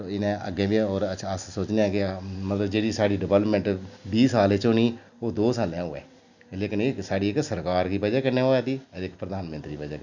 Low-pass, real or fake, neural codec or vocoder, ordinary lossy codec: 7.2 kHz; real; none; none